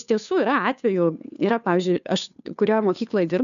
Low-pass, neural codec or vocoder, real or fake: 7.2 kHz; codec, 16 kHz, 2 kbps, FunCodec, trained on Chinese and English, 25 frames a second; fake